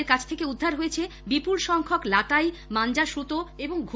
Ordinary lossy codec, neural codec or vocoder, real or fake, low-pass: none; none; real; none